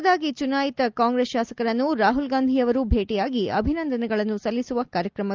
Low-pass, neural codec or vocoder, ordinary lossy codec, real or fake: 7.2 kHz; none; Opus, 24 kbps; real